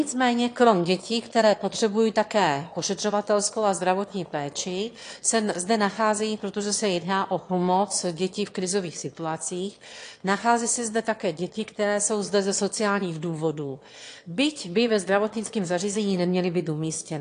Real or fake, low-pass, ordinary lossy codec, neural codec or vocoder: fake; 9.9 kHz; AAC, 48 kbps; autoencoder, 22.05 kHz, a latent of 192 numbers a frame, VITS, trained on one speaker